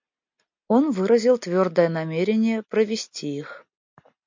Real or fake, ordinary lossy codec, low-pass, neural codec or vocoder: real; MP3, 48 kbps; 7.2 kHz; none